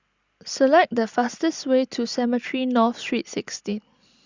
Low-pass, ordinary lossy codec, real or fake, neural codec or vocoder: 7.2 kHz; Opus, 64 kbps; real; none